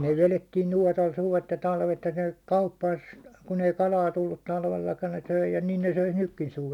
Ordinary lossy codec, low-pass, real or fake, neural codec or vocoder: none; 19.8 kHz; real; none